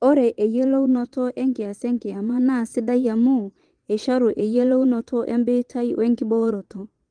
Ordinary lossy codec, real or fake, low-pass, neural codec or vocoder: Opus, 24 kbps; fake; 9.9 kHz; vocoder, 22.05 kHz, 80 mel bands, Vocos